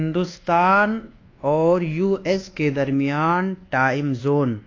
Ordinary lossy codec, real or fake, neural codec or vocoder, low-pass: AAC, 32 kbps; fake; codec, 24 kHz, 1.2 kbps, DualCodec; 7.2 kHz